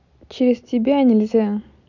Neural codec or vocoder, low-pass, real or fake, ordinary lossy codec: none; 7.2 kHz; real; none